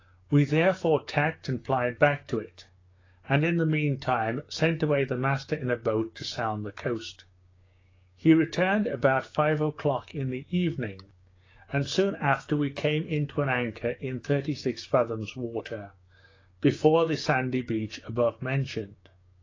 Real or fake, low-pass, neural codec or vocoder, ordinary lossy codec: fake; 7.2 kHz; codec, 16 kHz, 6 kbps, DAC; AAC, 32 kbps